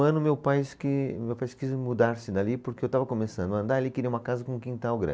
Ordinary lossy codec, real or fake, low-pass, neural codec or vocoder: none; real; none; none